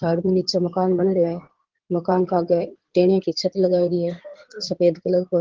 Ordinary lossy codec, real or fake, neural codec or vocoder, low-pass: Opus, 16 kbps; fake; codec, 16 kHz in and 24 kHz out, 2.2 kbps, FireRedTTS-2 codec; 7.2 kHz